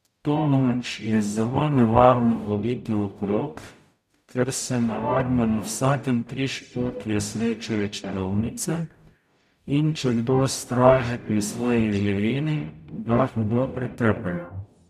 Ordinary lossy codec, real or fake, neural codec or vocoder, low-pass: none; fake; codec, 44.1 kHz, 0.9 kbps, DAC; 14.4 kHz